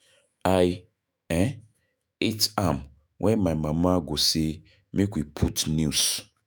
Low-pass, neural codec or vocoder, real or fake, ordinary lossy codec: none; autoencoder, 48 kHz, 128 numbers a frame, DAC-VAE, trained on Japanese speech; fake; none